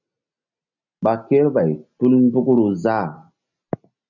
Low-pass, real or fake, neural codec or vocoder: 7.2 kHz; real; none